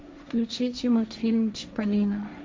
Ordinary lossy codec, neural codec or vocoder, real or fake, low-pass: none; codec, 16 kHz, 1.1 kbps, Voila-Tokenizer; fake; none